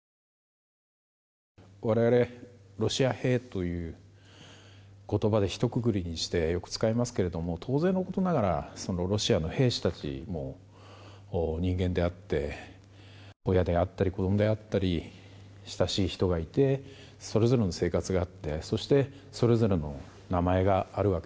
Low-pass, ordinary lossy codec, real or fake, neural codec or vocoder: none; none; real; none